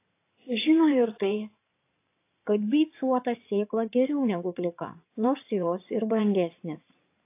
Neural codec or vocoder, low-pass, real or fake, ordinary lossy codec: codec, 16 kHz in and 24 kHz out, 2.2 kbps, FireRedTTS-2 codec; 3.6 kHz; fake; AAC, 24 kbps